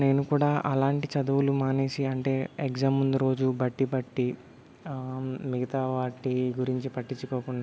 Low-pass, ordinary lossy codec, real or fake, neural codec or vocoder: none; none; real; none